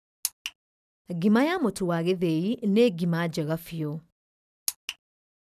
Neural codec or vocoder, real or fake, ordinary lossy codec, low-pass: none; real; none; 14.4 kHz